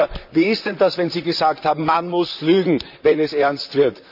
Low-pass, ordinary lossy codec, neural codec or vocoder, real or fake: 5.4 kHz; none; vocoder, 44.1 kHz, 128 mel bands, Pupu-Vocoder; fake